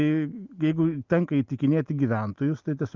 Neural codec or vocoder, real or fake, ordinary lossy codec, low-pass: none; real; Opus, 32 kbps; 7.2 kHz